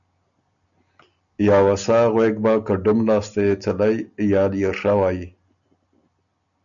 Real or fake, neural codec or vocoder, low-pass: real; none; 7.2 kHz